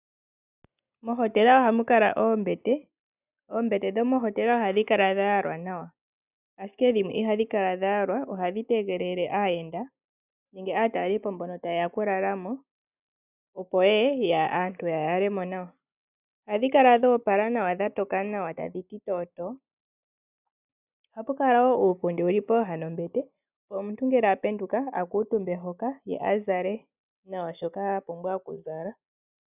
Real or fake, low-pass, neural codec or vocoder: real; 3.6 kHz; none